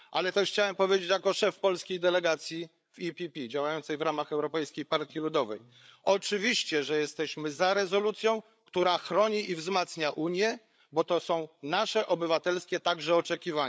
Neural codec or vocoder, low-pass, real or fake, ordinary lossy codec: codec, 16 kHz, 8 kbps, FreqCodec, larger model; none; fake; none